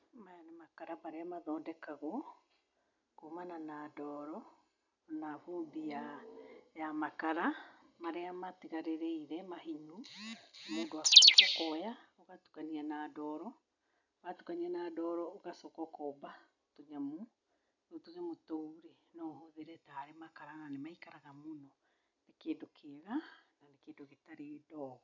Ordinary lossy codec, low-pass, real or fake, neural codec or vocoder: none; 7.2 kHz; real; none